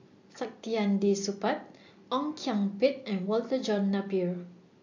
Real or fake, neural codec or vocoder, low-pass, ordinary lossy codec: real; none; 7.2 kHz; AAC, 48 kbps